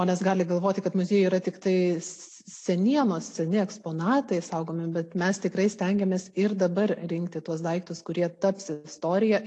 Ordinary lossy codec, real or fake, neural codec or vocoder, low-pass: AAC, 48 kbps; real; none; 10.8 kHz